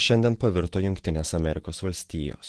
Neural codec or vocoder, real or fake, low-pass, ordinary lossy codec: autoencoder, 48 kHz, 128 numbers a frame, DAC-VAE, trained on Japanese speech; fake; 10.8 kHz; Opus, 16 kbps